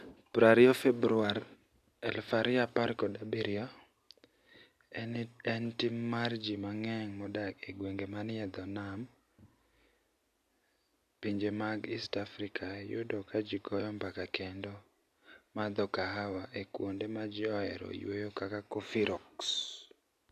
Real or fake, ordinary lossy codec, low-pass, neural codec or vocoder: real; none; 14.4 kHz; none